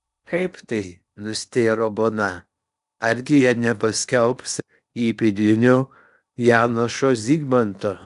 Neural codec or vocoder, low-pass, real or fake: codec, 16 kHz in and 24 kHz out, 0.8 kbps, FocalCodec, streaming, 65536 codes; 10.8 kHz; fake